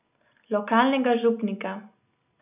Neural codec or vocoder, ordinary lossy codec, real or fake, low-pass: none; none; real; 3.6 kHz